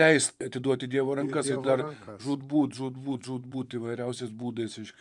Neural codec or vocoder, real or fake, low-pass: vocoder, 24 kHz, 100 mel bands, Vocos; fake; 10.8 kHz